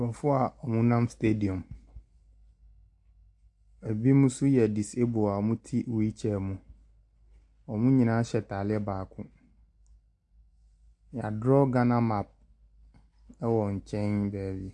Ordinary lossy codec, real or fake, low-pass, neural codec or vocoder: AAC, 64 kbps; real; 10.8 kHz; none